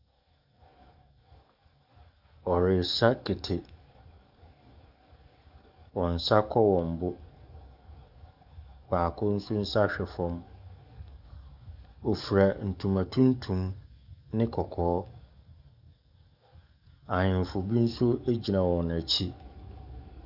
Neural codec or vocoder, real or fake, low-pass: codec, 44.1 kHz, 7.8 kbps, DAC; fake; 5.4 kHz